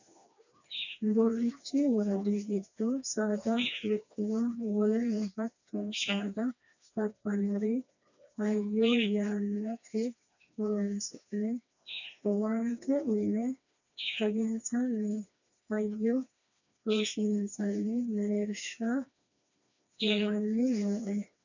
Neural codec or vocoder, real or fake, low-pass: codec, 16 kHz, 2 kbps, FreqCodec, smaller model; fake; 7.2 kHz